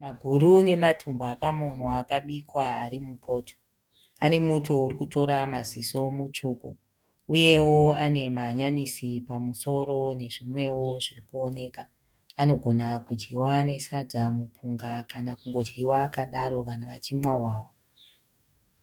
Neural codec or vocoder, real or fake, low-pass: codec, 44.1 kHz, 2.6 kbps, DAC; fake; 19.8 kHz